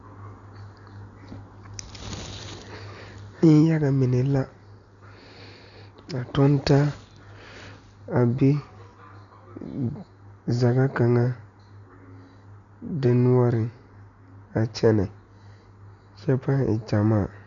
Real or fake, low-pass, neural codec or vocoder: real; 7.2 kHz; none